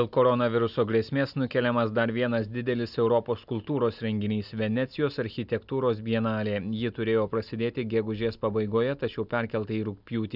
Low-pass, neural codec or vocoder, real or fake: 5.4 kHz; none; real